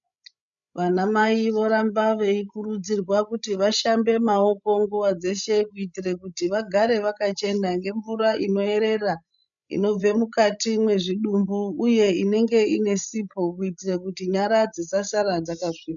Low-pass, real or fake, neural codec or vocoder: 7.2 kHz; fake; codec, 16 kHz, 16 kbps, FreqCodec, larger model